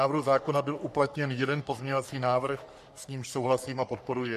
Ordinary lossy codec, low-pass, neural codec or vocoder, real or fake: MP3, 96 kbps; 14.4 kHz; codec, 44.1 kHz, 3.4 kbps, Pupu-Codec; fake